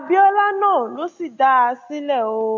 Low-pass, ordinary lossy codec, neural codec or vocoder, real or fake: 7.2 kHz; AAC, 48 kbps; none; real